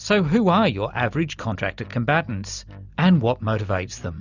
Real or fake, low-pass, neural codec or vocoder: real; 7.2 kHz; none